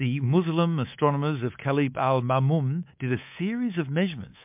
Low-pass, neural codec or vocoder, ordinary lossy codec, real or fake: 3.6 kHz; codec, 24 kHz, 3.1 kbps, DualCodec; MP3, 32 kbps; fake